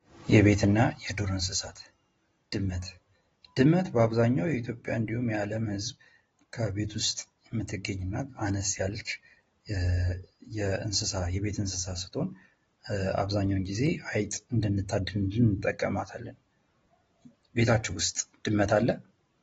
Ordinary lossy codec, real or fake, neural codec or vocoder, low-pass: AAC, 24 kbps; real; none; 19.8 kHz